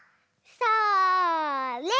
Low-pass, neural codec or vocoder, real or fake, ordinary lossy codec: none; none; real; none